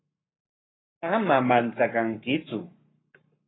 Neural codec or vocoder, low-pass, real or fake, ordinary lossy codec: codec, 44.1 kHz, 7.8 kbps, Pupu-Codec; 7.2 kHz; fake; AAC, 16 kbps